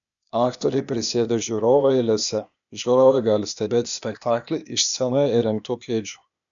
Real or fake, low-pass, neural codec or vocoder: fake; 7.2 kHz; codec, 16 kHz, 0.8 kbps, ZipCodec